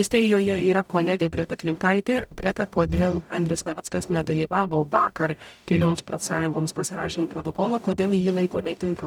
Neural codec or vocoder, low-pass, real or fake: codec, 44.1 kHz, 0.9 kbps, DAC; 19.8 kHz; fake